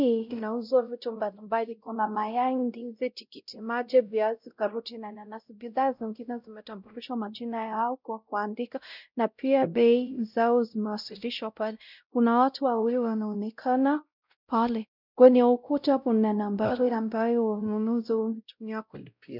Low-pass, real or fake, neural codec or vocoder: 5.4 kHz; fake; codec, 16 kHz, 0.5 kbps, X-Codec, WavLM features, trained on Multilingual LibriSpeech